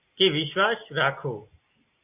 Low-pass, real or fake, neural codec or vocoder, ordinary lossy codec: 3.6 kHz; real; none; AAC, 32 kbps